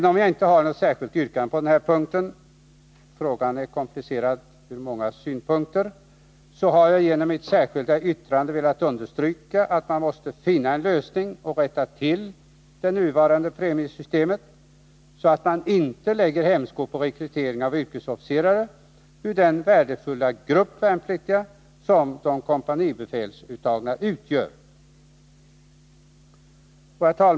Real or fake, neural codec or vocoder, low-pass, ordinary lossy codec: real; none; none; none